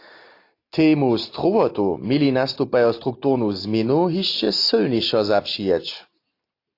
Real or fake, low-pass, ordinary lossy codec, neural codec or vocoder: real; 5.4 kHz; AAC, 32 kbps; none